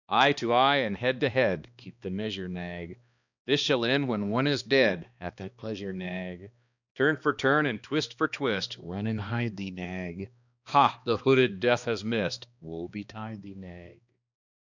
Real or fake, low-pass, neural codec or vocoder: fake; 7.2 kHz; codec, 16 kHz, 2 kbps, X-Codec, HuBERT features, trained on balanced general audio